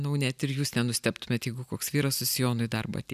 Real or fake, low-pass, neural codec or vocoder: real; 14.4 kHz; none